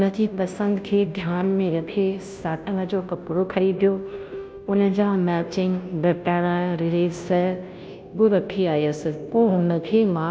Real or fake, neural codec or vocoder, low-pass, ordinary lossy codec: fake; codec, 16 kHz, 0.5 kbps, FunCodec, trained on Chinese and English, 25 frames a second; none; none